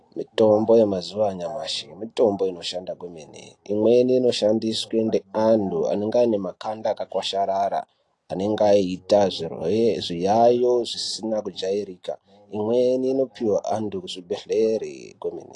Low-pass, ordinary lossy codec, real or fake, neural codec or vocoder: 10.8 kHz; AAC, 48 kbps; real; none